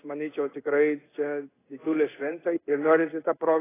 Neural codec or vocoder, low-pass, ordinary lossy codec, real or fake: codec, 16 kHz in and 24 kHz out, 1 kbps, XY-Tokenizer; 3.6 kHz; AAC, 16 kbps; fake